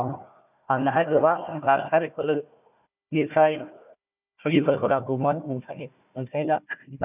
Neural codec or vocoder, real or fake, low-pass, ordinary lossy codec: codec, 16 kHz, 1 kbps, FunCodec, trained on Chinese and English, 50 frames a second; fake; 3.6 kHz; none